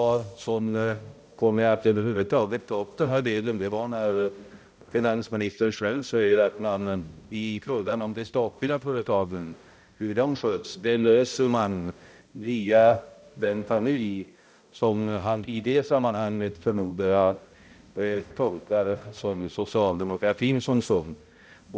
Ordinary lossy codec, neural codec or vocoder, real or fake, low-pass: none; codec, 16 kHz, 0.5 kbps, X-Codec, HuBERT features, trained on balanced general audio; fake; none